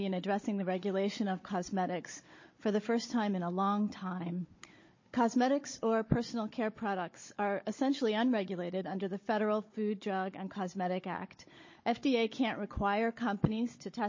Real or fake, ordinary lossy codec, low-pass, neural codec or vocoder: fake; MP3, 32 kbps; 7.2 kHz; codec, 16 kHz, 16 kbps, FunCodec, trained on Chinese and English, 50 frames a second